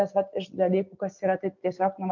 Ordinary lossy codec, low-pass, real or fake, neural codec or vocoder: MP3, 48 kbps; 7.2 kHz; real; none